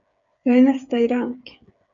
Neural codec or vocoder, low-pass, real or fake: codec, 16 kHz, 8 kbps, FreqCodec, smaller model; 7.2 kHz; fake